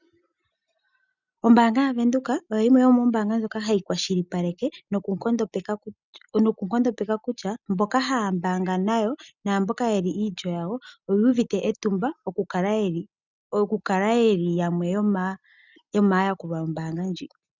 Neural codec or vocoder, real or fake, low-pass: none; real; 7.2 kHz